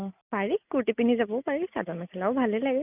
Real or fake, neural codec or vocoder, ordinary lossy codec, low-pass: real; none; none; 3.6 kHz